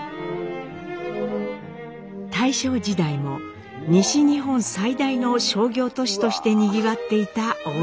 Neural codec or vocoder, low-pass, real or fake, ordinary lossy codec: none; none; real; none